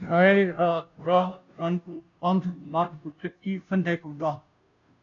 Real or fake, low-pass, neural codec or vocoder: fake; 7.2 kHz; codec, 16 kHz, 0.5 kbps, FunCodec, trained on Chinese and English, 25 frames a second